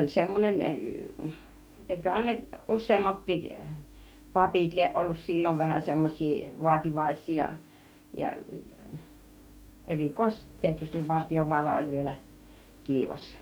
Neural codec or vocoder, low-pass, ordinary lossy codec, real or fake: codec, 44.1 kHz, 2.6 kbps, DAC; none; none; fake